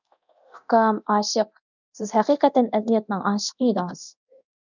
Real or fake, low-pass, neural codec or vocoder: fake; 7.2 kHz; codec, 24 kHz, 0.9 kbps, DualCodec